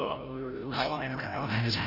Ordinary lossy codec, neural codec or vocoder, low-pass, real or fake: none; codec, 16 kHz, 0.5 kbps, FreqCodec, larger model; 5.4 kHz; fake